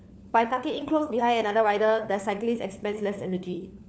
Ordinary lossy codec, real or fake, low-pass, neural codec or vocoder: none; fake; none; codec, 16 kHz, 4 kbps, FunCodec, trained on LibriTTS, 50 frames a second